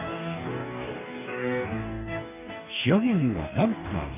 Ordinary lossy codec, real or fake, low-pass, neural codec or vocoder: none; fake; 3.6 kHz; codec, 44.1 kHz, 2.6 kbps, DAC